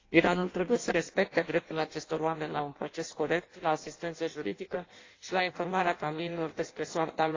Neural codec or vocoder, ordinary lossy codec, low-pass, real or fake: codec, 16 kHz in and 24 kHz out, 0.6 kbps, FireRedTTS-2 codec; AAC, 32 kbps; 7.2 kHz; fake